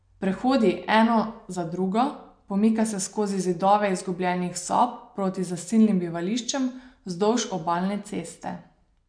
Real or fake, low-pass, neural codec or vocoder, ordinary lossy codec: real; 9.9 kHz; none; MP3, 64 kbps